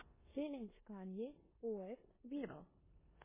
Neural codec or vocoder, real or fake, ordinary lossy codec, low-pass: codec, 16 kHz in and 24 kHz out, 0.9 kbps, LongCat-Audio-Codec, four codebook decoder; fake; MP3, 16 kbps; 3.6 kHz